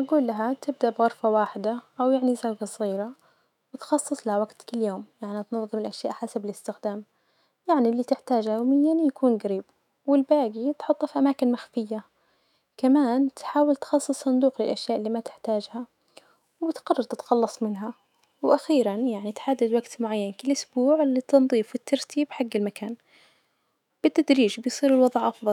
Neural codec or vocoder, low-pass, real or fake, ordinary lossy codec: autoencoder, 48 kHz, 128 numbers a frame, DAC-VAE, trained on Japanese speech; 19.8 kHz; fake; none